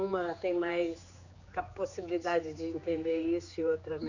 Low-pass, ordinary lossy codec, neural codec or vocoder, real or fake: 7.2 kHz; none; codec, 16 kHz, 4 kbps, X-Codec, HuBERT features, trained on general audio; fake